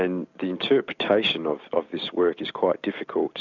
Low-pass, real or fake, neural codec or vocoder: 7.2 kHz; real; none